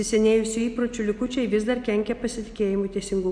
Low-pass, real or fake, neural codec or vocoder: 9.9 kHz; real; none